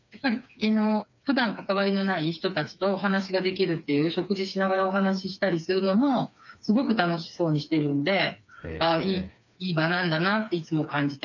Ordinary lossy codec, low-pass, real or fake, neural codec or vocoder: none; 7.2 kHz; fake; codec, 16 kHz, 4 kbps, FreqCodec, smaller model